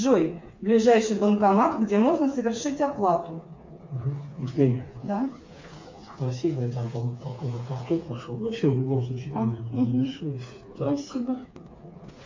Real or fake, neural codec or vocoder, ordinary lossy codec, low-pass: fake; codec, 16 kHz, 4 kbps, FreqCodec, smaller model; MP3, 48 kbps; 7.2 kHz